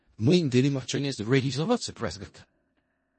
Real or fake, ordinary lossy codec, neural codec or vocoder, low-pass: fake; MP3, 32 kbps; codec, 16 kHz in and 24 kHz out, 0.4 kbps, LongCat-Audio-Codec, four codebook decoder; 10.8 kHz